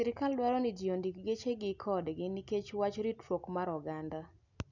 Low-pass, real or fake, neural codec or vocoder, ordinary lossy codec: 7.2 kHz; real; none; none